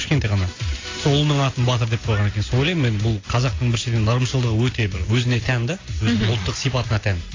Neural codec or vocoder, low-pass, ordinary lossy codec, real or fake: none; 7.2 kHz; AAC, 32 kbps; real